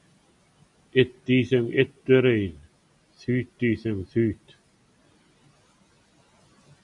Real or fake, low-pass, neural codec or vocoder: real; 10.8 kHz; none